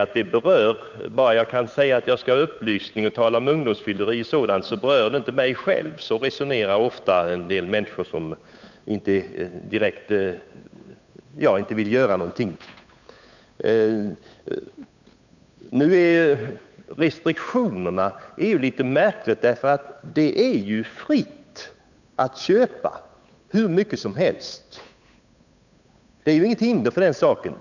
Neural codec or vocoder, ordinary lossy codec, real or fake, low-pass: codec, 16 kHz, 8 kbps, FunCodec, trained on Chinese and English, 25 frames a second; none; fake; 7.2 kHz